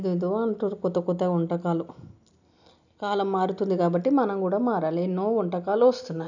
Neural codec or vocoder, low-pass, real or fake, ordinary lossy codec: none; 7.2 kHz; real; none